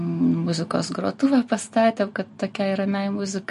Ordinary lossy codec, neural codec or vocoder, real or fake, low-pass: MP3, 48 kbps; none; real; 14.4 kHz